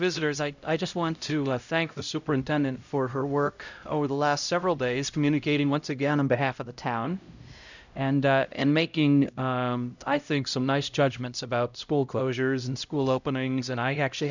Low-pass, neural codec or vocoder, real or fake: 7.2 kHz; codec, 16 kHz, 0.5 kbps, X-Codec, HuBERT features, trained on LibriSpeech; fake